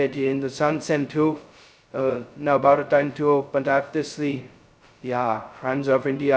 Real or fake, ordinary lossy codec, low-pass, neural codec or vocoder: fake; none; none; codec, 16 kHz, 0.2 kbps, FocalCodec